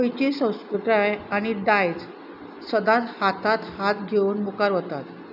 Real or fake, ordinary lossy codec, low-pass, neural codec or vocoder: real; none; 5.4 kHz; none